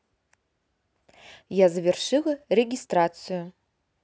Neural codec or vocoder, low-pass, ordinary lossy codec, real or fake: none; none; none; real